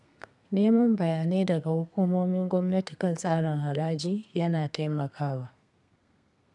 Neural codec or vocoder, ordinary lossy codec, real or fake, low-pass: codec, 32 kHz, 1.9 kbps, SNAC; none; fake; 10.8 kHz